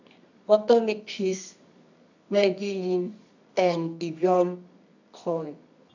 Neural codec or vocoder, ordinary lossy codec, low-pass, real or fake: codec, 24 kHz, 0.9 kbps, WavTokenizer, medium music audio release; none; 7.2 kHz; fake